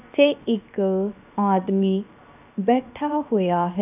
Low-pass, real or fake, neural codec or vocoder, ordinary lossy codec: 3.6 kHz; fake; codec, 16 kHz, 0.7 kbps, FocalCodec; none